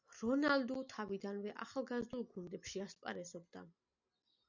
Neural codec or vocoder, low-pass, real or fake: none; 7.2 kHz; real